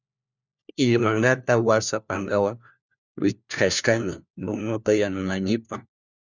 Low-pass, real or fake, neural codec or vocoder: 7.2 kHz; fake; codec, 16 kHz, 1 kbps, FunCodec, trained on LibriTTS, 50 frames a second